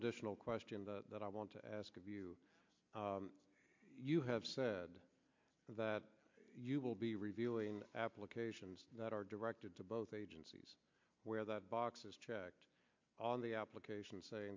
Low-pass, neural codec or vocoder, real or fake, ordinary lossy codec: 7.2 kHz; none; real; MP3, 48 kbps